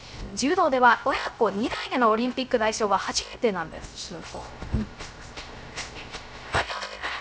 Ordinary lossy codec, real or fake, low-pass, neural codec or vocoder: none; fake; none; codec, 16 kHz, 0.3 kbps, FocalCodec